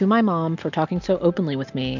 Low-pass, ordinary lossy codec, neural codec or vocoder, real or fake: 7.2 kHz; MP3, 64 kbps; none; real